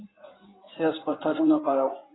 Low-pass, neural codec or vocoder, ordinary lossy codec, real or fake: 7.2 kHz; codec, 16 kHz in and 24 kHz out, 2.2 kbps, FireRedTTS-2 codec; AAC, 16 kbps; fake